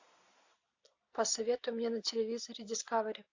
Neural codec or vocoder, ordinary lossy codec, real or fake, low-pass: none; AAC, 48 kbps; real; 7.2 kHz